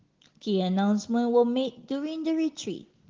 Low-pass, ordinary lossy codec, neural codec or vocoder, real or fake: 7.2 kHz; Opus, 16 kbps; none; real